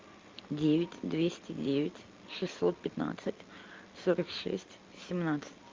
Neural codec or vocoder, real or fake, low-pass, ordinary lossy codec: none; real; 7.2 kHz; Opus, 24 kbps